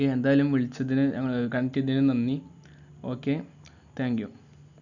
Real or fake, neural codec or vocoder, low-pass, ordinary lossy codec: real; none; 7.2 kHz; none